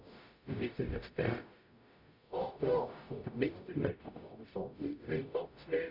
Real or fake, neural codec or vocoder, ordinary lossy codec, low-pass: fake; codec, 44.1 kHz, 0.9 kbps, DAC; none; 5.4 kHz